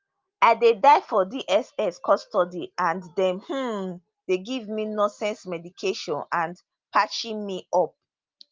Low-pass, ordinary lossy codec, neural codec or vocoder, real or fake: 7.2 kHz; Opus, 32 kbps; none; real